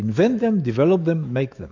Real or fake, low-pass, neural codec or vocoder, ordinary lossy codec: fake; 7.2 kHz; autoencoder, 48 kHz, 128 numbers a frame, DAC-VAE, trained on Japanese speech; AAC, 48 kbps